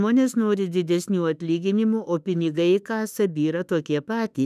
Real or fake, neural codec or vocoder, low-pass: fake; autoencoder, 48 kHz, 32 numbers a frame, DAC-VAE, trained on Japanese speech; 14.4 kHz